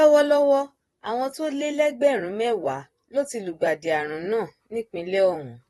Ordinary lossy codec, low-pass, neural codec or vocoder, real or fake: AAC, 32 kbps; 19.8 kHz; vocoder, 44.1 kHz, 128 mel bands, Pupu-Vocoder; fake